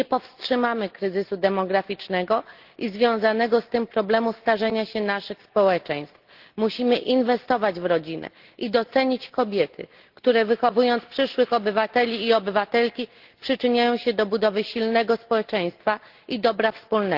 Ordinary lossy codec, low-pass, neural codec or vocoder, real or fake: Opus, 16 kbps; 5.4 kHz; none; real